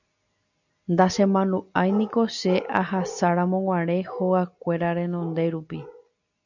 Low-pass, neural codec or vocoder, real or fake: 7.2 kHz; none; real